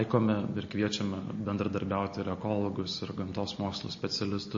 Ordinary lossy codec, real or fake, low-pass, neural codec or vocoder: MP3, 32 kbps; real; 7.2 kHz; none